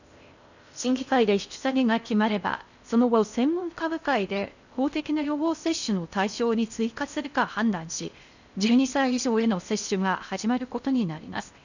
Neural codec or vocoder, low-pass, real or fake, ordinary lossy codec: codec, 16 kHz in and 24 kHz out, 0.6 kbps, FocalCodec, streaming, 4096 codes; 7.2 kHz; fake; none